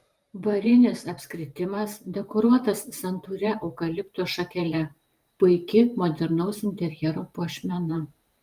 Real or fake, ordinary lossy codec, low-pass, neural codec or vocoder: fake; Opus, 32 kbps; 14.4 kHz; vocoder, 44.1 kHz, 128 mel bands every 512 samples, BigVGAN v2